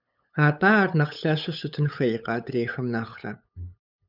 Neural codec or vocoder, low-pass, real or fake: codec, 16 kHz, 8 kbps, FunCodec, trained on LibriTTS, 25 frames a second; 5.4 kHz; fake